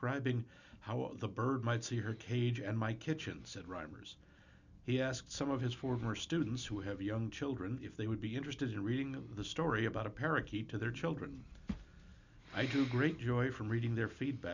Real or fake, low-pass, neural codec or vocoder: real; 7.2 kHz; none